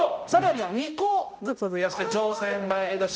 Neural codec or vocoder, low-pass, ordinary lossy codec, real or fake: codec, 16 kHz, 0.5 kbps, X-Codec, HuBERT features, trained on balanced general audio; none; none; fake